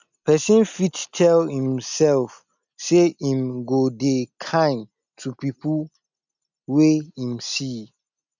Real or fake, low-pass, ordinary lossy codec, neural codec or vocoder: real; 7.2 kHz; none; none